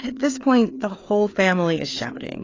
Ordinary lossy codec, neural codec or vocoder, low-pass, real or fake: AAC, 32 kbps; codec, 16 kHz, 4 kbps, FreqCodec, larger model; 7.2 kHz; fake